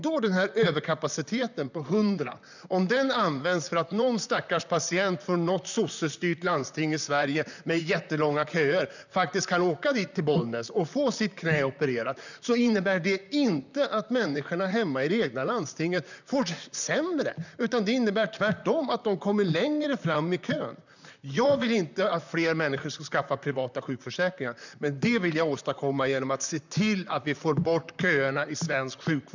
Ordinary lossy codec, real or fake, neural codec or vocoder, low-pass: none; fake; vocoder, 44.1 kHz, 128 mel bands, Pupu-Vocoder; 7.2 kHz